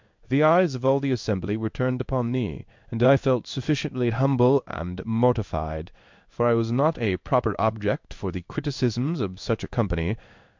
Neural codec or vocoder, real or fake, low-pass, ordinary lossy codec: codec, 16 kHz in and 24 kHz out, 1 kbps, XY-Tokenizer; fake; 7.2 kHz; MP3, 64 kbps